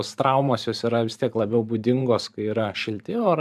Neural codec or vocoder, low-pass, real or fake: vocoder, 44.1 kHz, 128 mel bands every 512 samples, BigVGAN v2; 14.4 kHz; fake